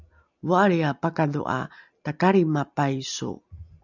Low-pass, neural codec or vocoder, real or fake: 7.2 kHz; none; real